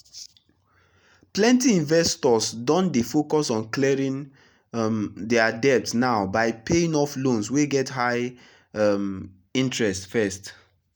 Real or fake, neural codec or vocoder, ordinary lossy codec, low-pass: real; none; none; none